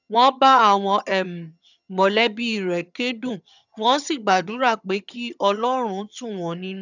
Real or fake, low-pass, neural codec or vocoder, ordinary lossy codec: fake; 7.2 kHz; vocoder, 22.05 kHz, 80 mel bands, HiFi-GAN; none